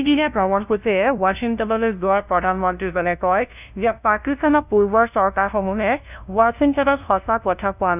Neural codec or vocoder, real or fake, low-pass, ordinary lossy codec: codec, 16 kHz, 0.5 kbps, FunCodec, trained on LibriTTS, 25 frames a second; fake; 3.6 kHz; none